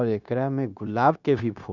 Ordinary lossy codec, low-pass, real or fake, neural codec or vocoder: none; 7.2 kHz; fake; codec, 16 kHz, 0.9 kbps, LongCat-Audio-Codec